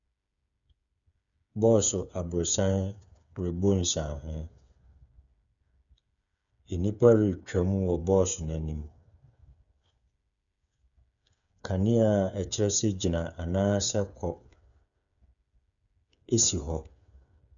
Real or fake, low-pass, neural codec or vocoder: fake; 7.2 kHz; codec, 16 kHz, 8 kbps, FreqCodec, smaller model